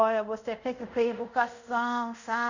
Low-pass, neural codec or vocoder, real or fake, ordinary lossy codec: 7.2 kHz; codec, 24 kHz, 0.5 kbps, DualCodec; fake; none